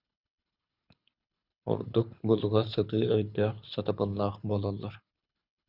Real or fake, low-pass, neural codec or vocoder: fake; 5.4 kHz; codec, 24 kHz, 6 kbps, HILCodec